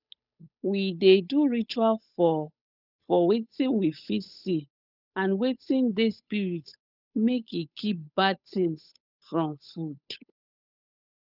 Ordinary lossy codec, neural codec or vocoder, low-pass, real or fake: none; codec, 16 kHz, 8 kbps, FunCodec, trained on Chinese and English, 25 frames a second; 5.4 kHz; fake